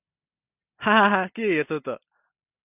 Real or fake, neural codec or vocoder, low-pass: real; none; 3.6 kHz